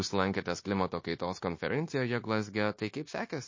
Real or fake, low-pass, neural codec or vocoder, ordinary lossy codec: fake; 7.2 kHz; codec, 16 kHz, 0.9 kbps, LongCat-Audio-Codec; MP3, 32 kbps